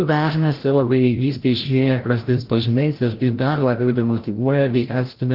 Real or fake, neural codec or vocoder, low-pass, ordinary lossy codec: fake; codec, 16 kHz, 0.5 kbps, FreqCodec, larger model; 5.4 kHz; Opus, 16 kbps